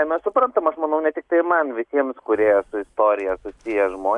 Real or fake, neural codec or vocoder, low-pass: real; none; 10.8 kHz